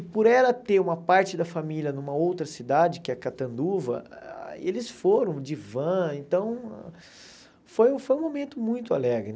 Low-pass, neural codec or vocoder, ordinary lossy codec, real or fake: none; none; none; real